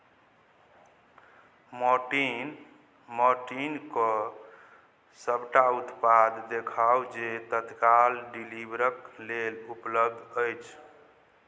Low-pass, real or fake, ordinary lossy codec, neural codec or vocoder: none; real; none; none